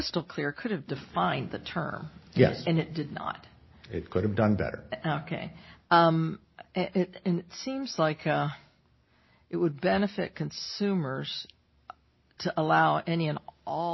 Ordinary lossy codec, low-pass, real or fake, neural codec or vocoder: MP3, 24 kbps; 7.2 kHz; real; none